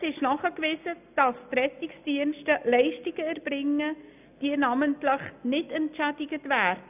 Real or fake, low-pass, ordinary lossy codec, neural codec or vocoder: real; 3.6 kHz; none; none